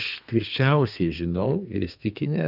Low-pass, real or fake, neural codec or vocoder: 5.4 kHz; fake; codec, 32 kHz, 1.9 kbps, SNAC